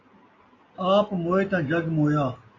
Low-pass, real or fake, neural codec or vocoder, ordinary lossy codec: 7.2 kHz; real; none; AAC, 32 kbps